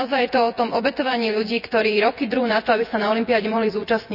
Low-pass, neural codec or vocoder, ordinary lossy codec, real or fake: 5.4 kHz; vocoder, 24 kHz, 100 mel bands, Vocos; none; fake